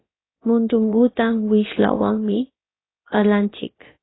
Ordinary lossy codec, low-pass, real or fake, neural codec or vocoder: AAC, 16 kbps; 7.2 kHz; fake; codec, 16 kHz, about 1 kbps, DyCAST, with the encoder's durations